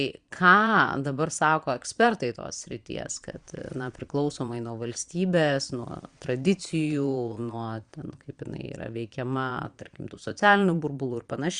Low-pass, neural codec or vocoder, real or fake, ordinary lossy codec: 9.9 kHz; vocoder, 22.05 kHz, 80 mel bands, Vocos; fake; Opus, 64 kbps